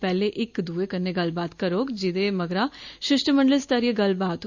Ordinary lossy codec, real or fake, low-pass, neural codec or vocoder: none; real; 7.2 kHz; none